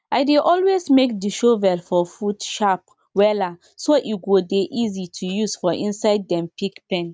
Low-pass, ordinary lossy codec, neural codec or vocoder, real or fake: none; none; none; real